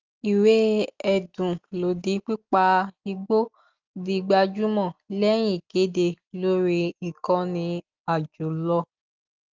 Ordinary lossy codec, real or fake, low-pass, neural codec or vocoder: Opus, 32 kbps; real; 7.2 kHz; none